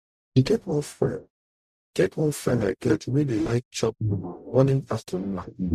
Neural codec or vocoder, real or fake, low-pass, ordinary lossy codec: codec, 44.1 kHz, 0.9 kbps, DAC; fake; 14.4 kHz; none